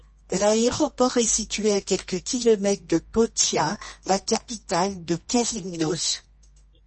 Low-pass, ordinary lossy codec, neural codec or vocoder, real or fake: 10.8 kHz; MP3, 32 kbps; codec, 24 kHz, 0.9 kbps, WavTokenizer, medium music audio release; fake